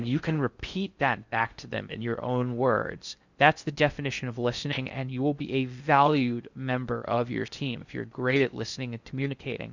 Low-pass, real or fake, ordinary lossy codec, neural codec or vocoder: 7.2 kHz; fake; Opus, 64 kbps; codec, 16 kHz in and 24 kHz out, 0.6 kbps, FocalCodec, streaming, 4096 codes